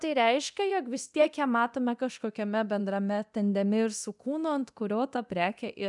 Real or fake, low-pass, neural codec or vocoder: fake; 10.8 kHz; codec, 24 kHz, 0.9 kbps, DualCodec